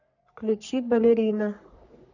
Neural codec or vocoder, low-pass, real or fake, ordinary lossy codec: codec, 44.1 kHz, 3.4 kbps, Pupu-Codec; 7.2 kHz; fake; none